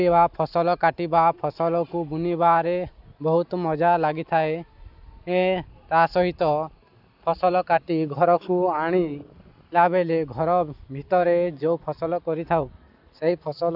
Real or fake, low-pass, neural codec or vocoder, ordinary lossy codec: real; 5.4 kHz; none; AAC, 48 kbps